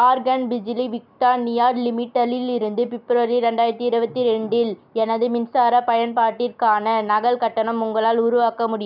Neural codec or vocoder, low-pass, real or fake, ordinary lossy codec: none; 5.4 kHz; real; none